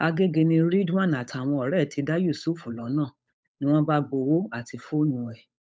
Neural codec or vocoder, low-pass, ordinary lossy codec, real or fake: codec, 16 kHz, 8 kbps, FunCodec, trained on Chinese and English, 25 frames a second; none; none; fake